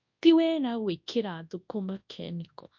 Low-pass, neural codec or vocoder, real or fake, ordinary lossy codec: 7.2 kHz; codec, 24 kHz, 0.9 kbps, WavTokenizer, large speech release; fake; MP3, 48 kbps